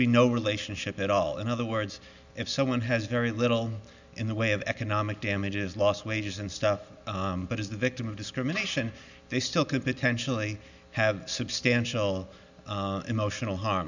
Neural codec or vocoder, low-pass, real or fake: none; 7.2 kHz; real